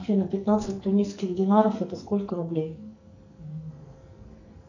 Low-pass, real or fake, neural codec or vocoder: 7.2 kHz; fake; codec, 44.1 kHz, 2.6 kbps, SNAC